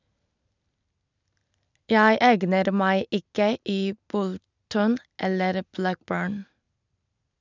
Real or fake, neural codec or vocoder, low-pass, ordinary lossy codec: real; none; 7.2 kHz; none